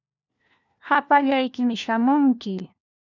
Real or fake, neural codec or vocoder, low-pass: fake; codec, 16 kHz, 1 kbps, FunCodec, trained on LibriTTS, 50 frames a second; 7.2 kHz